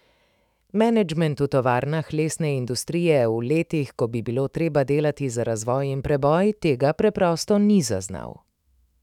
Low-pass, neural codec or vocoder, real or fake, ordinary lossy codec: 19.8 kHz; autoencoder, 48 kHz, 128 numbers a frame, DAC-VAE, trained on Japanese speech; fake; none